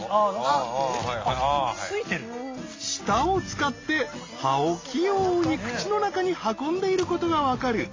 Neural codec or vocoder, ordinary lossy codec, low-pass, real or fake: none; AAC, 32 kbps; 7.2 kHz; real